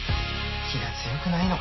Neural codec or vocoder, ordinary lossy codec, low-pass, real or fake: none; MP3, 24 kbps; 7.2 kHz; real